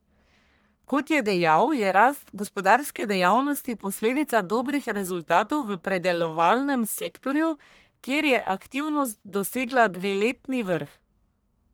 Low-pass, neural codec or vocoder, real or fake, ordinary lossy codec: none; codec, 44.1 kHz, 1.7 kbps, Pupu-Codec; fake; none